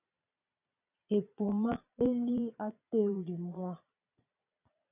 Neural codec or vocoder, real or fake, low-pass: vocoder, 22.05 kHz, 80 mel bands, Vocos; fake; 3.6 kHz